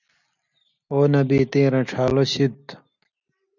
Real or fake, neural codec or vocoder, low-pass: real; none; 7.2 kHz